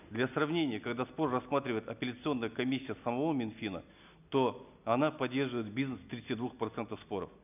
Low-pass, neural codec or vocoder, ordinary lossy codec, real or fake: 3.6 kHz; none; none; real